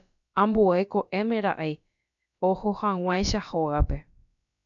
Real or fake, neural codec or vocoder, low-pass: fake; codec, 16 kHz, about 1 kbps, DyCAST, with the encoder's durations; 7.2 kHz